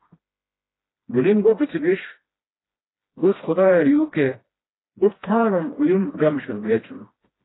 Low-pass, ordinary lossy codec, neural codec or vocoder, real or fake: 7.2 kHz; AAC, 16 kbps; codec, 16 kHz, 1 kbps, FreqCodec, smaller model; fake